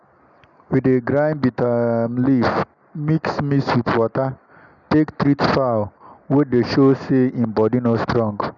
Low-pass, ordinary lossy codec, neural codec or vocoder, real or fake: 7.2 kHz; MP3, 96 kbps; none; real